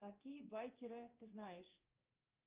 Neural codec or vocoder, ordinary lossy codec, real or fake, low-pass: codec, 24 kHz, 3.1 kbps, DualCodec; Opus, 32 kbps; fake; 3.6 kHz